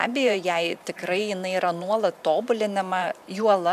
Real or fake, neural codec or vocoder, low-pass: fake; vocoder, 44.1 kHz, 128 mel bands every 512 samples, BigVGAN v2; 14.4 kHz